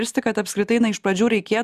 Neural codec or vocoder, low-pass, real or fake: none; 14.4 kHz; real